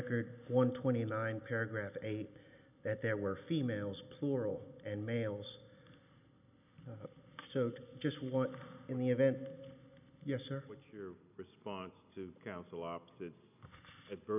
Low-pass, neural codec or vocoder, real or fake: 3.6 kHz; none; real